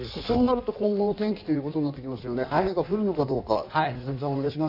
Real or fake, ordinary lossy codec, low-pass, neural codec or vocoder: fake; AAC, 48 kbps; 5.4 kHz; codec, 16 kHz in and 24 kHz out, 1.1 kbps, FireRedTTS-2 codec